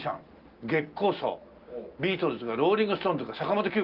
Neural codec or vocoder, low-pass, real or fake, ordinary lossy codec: none; 5.4 kHz; real; Opus, 32 kbps